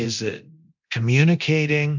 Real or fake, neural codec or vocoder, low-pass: fake; codec, 24 kHz, 0.9 kbps, DualCodec; 7.2 kHz